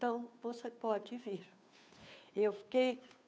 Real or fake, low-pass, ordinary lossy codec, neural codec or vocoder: fake; none; none; codec, 16 kHz, 2 kbps, FunCodec, trained on Chinese and English, 25 frames a second